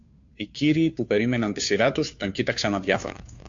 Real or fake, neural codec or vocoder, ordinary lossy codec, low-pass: fake; codec, 16 kHz, 2 kbps, FunCodec, trained on Chinese and English, 25 frames a second; AAC, 64 kbps; 7.2 kHz